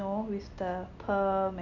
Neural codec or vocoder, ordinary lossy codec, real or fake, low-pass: none; none; real; 7.2 kHz